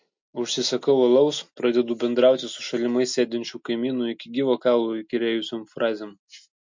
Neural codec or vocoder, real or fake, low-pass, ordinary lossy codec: none; real; 7.2 kHz; MP3, 48 kbps